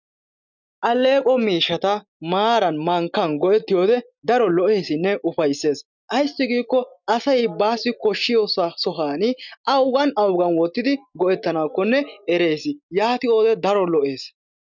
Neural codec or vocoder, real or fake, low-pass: none; real; 7.2 kHz